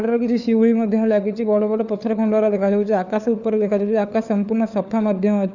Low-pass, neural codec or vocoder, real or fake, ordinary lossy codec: 7.2 kHz; codec, 16 kHz, 4 kbps, FunCodec, trained on LibriTTS, 50 frames a second; fake; none